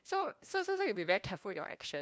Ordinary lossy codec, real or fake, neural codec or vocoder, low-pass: none; fake; codec, 16 kHz, 1 kbps, FunCodec, trained on LibriTTS, 50 frames a second; none